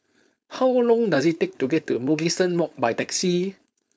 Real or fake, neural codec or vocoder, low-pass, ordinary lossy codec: fake; codec, 16 kHz, 4.8 kbps, FACodec; none; none